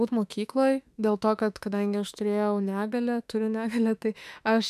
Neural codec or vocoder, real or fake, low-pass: autoencoder, 48 kHz, 32 numbers a frame, DAC-VAE, trained on Japanese speech; fake; 14.4 kHz